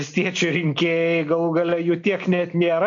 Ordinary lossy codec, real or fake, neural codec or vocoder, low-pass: AAC, 64 kbps; real; none; 7.2 kHz